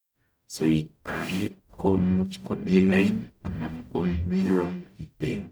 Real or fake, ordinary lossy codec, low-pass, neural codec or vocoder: fake; none; none; codec, 44.1 kHz, 0.9 kbps, DAC